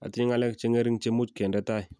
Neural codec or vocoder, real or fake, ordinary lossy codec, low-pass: none; real; none; none